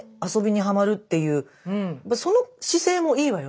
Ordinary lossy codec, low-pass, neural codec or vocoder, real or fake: none; none; none; real